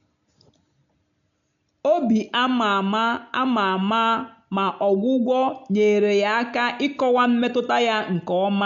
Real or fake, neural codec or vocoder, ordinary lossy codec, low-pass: real; none; none; 7.2 kHz